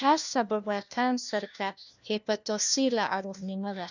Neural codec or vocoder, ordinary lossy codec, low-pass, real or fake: codec, 16 kHz, 1 kbps, FunCodec, trained on LibriTTS, 50 frames a second; none; 7.2 kHz; fake